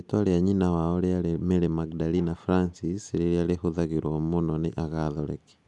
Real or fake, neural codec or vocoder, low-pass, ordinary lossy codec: real; none; 10.8 kHz; none